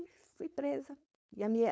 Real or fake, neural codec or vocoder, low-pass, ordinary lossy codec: fake; codec, 16 kHz, 4.8 kbps, FACodec; none; none